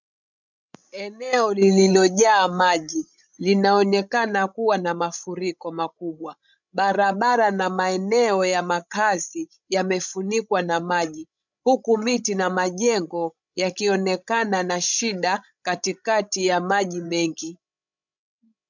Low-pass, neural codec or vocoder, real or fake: 7.2 kHz; codec, 16 kHz, 16 kbps, FreqCodec, larger model; fake